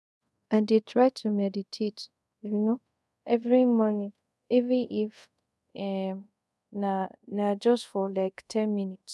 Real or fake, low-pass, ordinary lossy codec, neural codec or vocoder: fake; none; none; codec, 24 kHz, 0.5 kbps, DualCodec